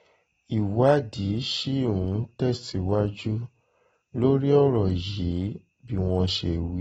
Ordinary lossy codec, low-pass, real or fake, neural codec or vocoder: AAC, 24 kbps; 14.4 kHz; real; none